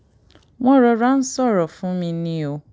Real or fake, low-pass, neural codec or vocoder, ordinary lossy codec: real; none; none; none